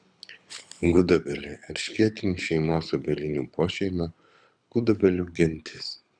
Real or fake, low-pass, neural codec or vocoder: fake; 9.9 kHz; codec, 24 kHz, 6 kbps, HILCodec